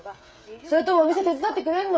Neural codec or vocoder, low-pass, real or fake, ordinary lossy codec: codec, 16 kHz, 16 kbps, FreqCodec, smaller model; none; fake; none